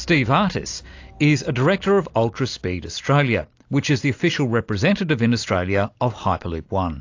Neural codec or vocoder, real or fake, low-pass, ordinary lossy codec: none; real; 7.2 kHz; AAC, 48 kbps